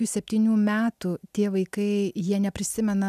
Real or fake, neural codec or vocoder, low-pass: real; none; 14.4 kHz